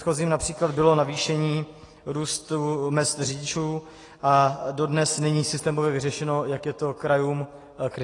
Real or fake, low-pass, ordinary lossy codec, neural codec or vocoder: real; 10.8 kHz; AAC, 32 kbps; none